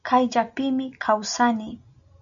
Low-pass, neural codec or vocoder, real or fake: 7.2 kHz; none; real